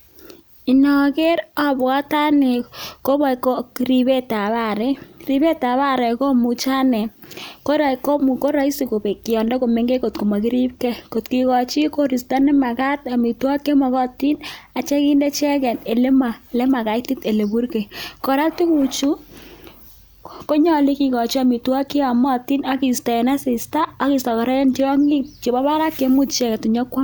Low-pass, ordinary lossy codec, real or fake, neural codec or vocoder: none; none; real; none